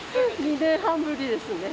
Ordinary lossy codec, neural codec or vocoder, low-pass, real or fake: none; none; none; real